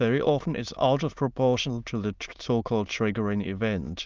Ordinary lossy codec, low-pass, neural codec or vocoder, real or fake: Opus, 32 kbps; 7.2 kHz; autoencoder, 22.05 kHz, a latent of 192 numbers a frame, VITS, trained on many speakers; fake